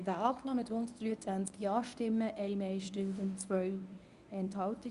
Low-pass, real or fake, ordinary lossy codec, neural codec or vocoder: 10.8 kHz; fake; Opus, 64 kbps; codec, 24 kHz, 0.9 kbps, WavTokenizer, medium speech release version 1